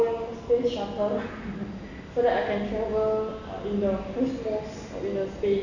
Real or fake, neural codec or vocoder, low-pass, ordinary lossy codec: real; none; 7.2 kHz; none